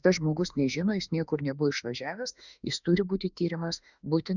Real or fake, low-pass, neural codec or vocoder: fake; 7.2 kHz; autoencoder, 48 kHz, 32 numbers a frame, DAC-VAE, trained on Japanese speech